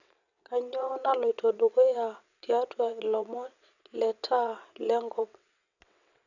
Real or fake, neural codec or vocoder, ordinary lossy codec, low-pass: fake; vocoder, 22.05 kHz, 80 mel bands, WaveNeXt; none; 7.2 kHz